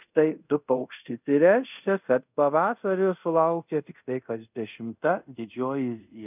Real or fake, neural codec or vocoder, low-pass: fake; codec, 24 kHz, 0.5 kbps, DualCodec; 3.6 kHz